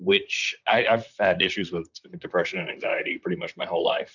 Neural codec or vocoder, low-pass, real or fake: vocoder, 44.1 kHz, 128 mel bands, Pupu-Vocoder; 7.2 kHz; fake